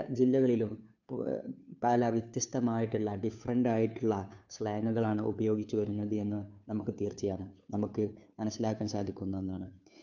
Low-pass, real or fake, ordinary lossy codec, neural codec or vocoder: 7.2 kHz; fake; none; codec, 16 kHz, 2 kbps, FunCodec, trained on Chinese and English, 25 frames a second